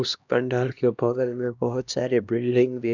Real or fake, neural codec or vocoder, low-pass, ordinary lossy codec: fake; codec, 16 kHz, 1 kbps, X-Codec, HuBERT features, trained on LibriSpeech; 7.2 kHz; none